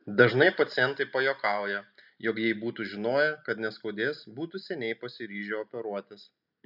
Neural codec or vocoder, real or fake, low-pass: none; real; 5.4 kHz